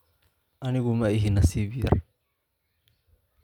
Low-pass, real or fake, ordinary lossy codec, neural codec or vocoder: 19.8 kHz; real; none; none